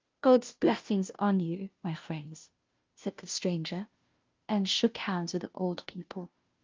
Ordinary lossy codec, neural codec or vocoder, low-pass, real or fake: Opus, 24 kbps; codec, 16 kHz, 0.5 kbps, FunCodec, trained on Chinese and English, 25 frames a second; 7.2 kHz; fake